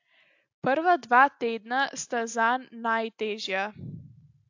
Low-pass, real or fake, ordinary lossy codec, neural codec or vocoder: 7.2 kHz; real; AAC, 48 kbps; none